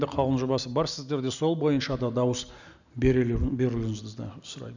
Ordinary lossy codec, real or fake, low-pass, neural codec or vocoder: none; real; 7.2 kHz; none